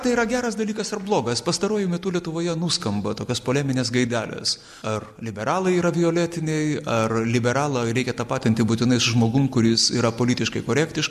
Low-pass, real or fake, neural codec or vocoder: 14.4 kHz; real; none